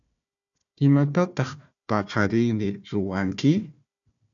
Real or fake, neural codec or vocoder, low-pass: fake; codec, 16 kHz, 1 kbps, FunCodec, trained on Chinese and English, 50 frames a second; 7.2 kHz